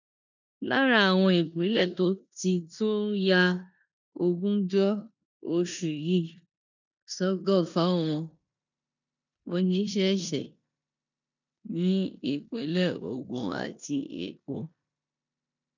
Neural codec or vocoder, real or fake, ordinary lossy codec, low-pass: codec, 16 kHz in and 24 kHz out, 0.9 kbps, LongCat-Audio-Codec, four codebook decoder; fake; none; 7.2 kHz